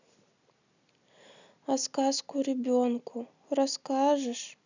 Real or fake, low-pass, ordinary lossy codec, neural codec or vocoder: real; 7.2 kHz; none; none